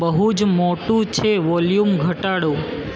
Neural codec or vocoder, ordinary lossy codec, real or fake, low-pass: none; none; real; none